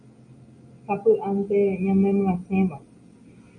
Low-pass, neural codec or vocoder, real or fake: 9.9 kHz; none; real